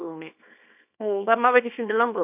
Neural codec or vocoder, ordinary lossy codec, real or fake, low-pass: codec, 24 kHz, 0.9 kbps, WavTokenizer, small release; none; fake; 3.6 kHz